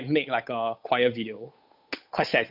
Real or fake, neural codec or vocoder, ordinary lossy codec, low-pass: fake; codec, 16 kHz, 8 kbps, FunCodec, trained on Chinese and English, 25 frames a second; AAC, 48 kbps; 5.4 kHz